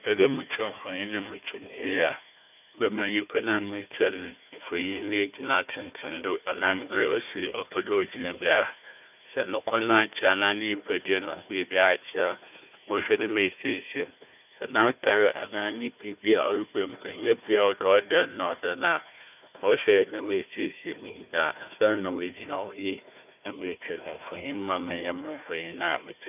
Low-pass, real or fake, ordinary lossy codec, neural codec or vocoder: 3.6 kHz; fake; none; codec, 16 kHz, 1 kbps, FunCodec, trained on Chinese and English, 50 frames a second